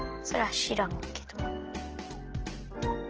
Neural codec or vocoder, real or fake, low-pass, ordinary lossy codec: none; real; 7.2 kHz; Opus, 16 kbps